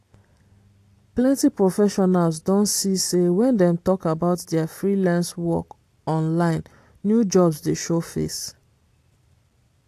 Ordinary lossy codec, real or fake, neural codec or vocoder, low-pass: AAC, 64 kbps; real; none; 14.4 kHz